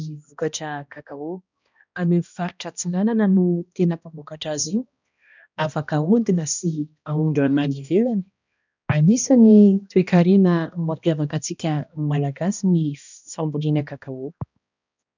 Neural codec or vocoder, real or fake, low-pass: codec, 16 kHz, 1 kbps, X-Codec, HuBERT features, trained on balanced general audio; fake; 7.2 kHz